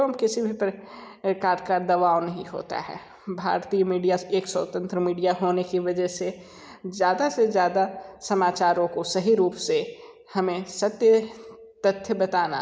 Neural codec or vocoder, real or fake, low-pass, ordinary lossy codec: none; real; none; none